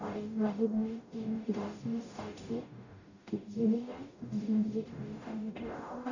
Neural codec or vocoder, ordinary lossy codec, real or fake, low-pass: codec, 44.1 kHz, 0.9 kbps, DAC; none; fake; 7.2 kHz